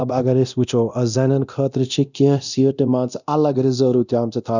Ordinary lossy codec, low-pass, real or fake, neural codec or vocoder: none; 7.2 kHz; fake; codec, 24 kHz, 0.9 kbps, DualCodec